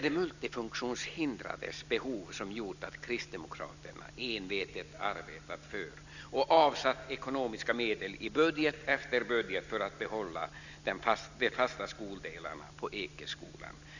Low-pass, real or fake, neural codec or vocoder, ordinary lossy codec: 7.2 kHz; real; none; none